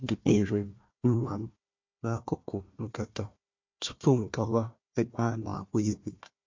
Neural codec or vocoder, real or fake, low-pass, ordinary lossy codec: codec, 16 kHz, 1 kbps, FunCodec, trained on Chinese and English, 50 frames a second; fake; 7.2 kHz; MP3, 48 kbps